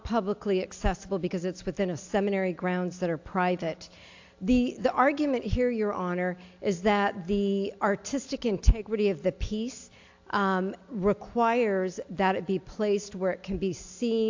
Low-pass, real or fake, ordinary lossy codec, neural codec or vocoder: 7.2 kHz; real; AAC, 48 kbps; none